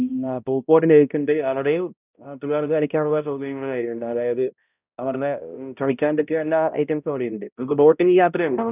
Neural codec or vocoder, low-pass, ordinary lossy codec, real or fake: codec, 16 kHz, 0.5 kbps, X-Codec, HuBERT features, trained on balanced general audio; 3.6 kHz; none; fake